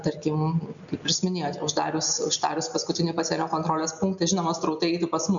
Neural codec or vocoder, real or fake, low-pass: none; real; 7.2 kHz